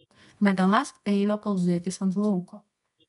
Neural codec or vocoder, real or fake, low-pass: codec, 24 kHz, 0.9 kbps, WavTokenizer, medium music audio release; fake; 10.8 kHz